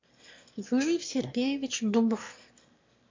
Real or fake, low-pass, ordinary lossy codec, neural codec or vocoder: fake; 7.2 kHz; MP3, 48 kbps; autoencoder, 22.05 kHz, a latent of 192 numbers a frame, VITS, trained on one speaker